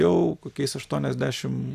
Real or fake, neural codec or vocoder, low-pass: real; none; 14.4 kHz